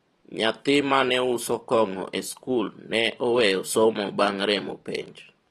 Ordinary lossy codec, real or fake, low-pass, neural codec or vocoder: AAC, 32 kbps; fake; 19.8 kHz; vocoder, 44.1 kHz, 128 mel bands every 512 samples, BigVGAN v2